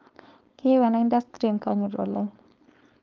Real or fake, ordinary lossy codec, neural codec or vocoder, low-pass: fake; Opus, 24 kbps; codec, 16 kHz, 4.8 kbps, FACodec; 7.2 kHz